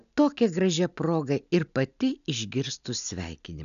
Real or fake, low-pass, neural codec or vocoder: real; 7.2 kHz; none